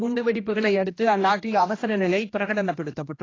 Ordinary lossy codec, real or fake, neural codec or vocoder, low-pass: AAC, 32 kbps; fake; codec, 16 kHz, 1 kbps, X-Codec, HuBERT features, trained on general audio; 7.2 kHz